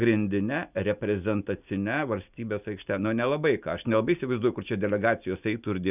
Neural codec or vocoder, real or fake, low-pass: none; real; 3.6 kHz